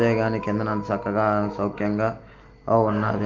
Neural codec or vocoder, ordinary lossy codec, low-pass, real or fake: none; Opus, 32 kbps; 7.2 kHz; real